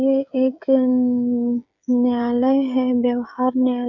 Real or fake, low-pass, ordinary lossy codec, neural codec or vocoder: fake; 7.2 kHz; none; codec, 16 kHz, 16 kbps, FreqCodec, smaller model